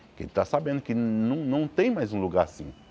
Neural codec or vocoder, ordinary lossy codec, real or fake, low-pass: none; none; real; none